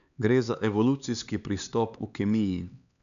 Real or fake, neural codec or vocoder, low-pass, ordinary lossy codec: fake; codec, 16 kHz, 4 kbps, X-Codec, HuBERT features, trained on LibriSpeech; 7.2 kHz; none